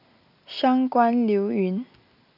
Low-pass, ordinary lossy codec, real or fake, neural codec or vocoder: 5.4 kHz; none; real; none